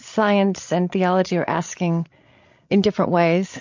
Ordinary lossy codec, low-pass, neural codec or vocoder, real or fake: MP3, 48 kbps; 7.2 kHz; codec, 16 kHz, 16 kbps, FreqCodec, larger model; fake